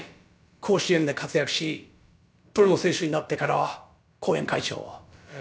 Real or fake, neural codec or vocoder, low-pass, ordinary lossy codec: fake; codec, 16 kHz, about 1 kbps, DyCAST, with the encoder's durations; none; none